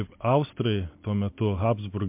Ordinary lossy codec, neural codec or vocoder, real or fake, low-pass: MP3, 32 kbps; none; real; 3.6 kHz